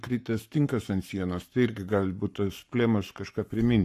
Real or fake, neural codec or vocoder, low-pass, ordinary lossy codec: fake; codec, 44.1 kHz, 7.8 kbps, Pupu-Codec; 14.4 kHz; AAC, 64 kbps